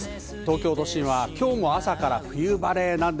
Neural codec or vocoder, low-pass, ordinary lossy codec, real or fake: none; none; none; real